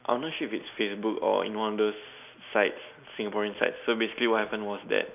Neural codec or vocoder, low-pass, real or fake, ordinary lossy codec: none; 3.6 kHz; real; none